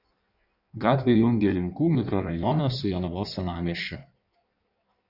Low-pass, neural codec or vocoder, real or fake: 5.4 kHz; codec, 16 kHz in and 24 kHz out, 1.1 kbps, FireRedTTS-2 codec; fake